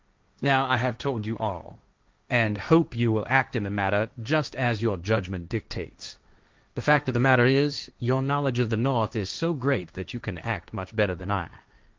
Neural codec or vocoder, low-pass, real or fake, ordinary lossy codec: codec, 16 kHz, 1.1 kbps, Voila-Tokenizer; 7.2 kHz; fake; Opus, 24 kbps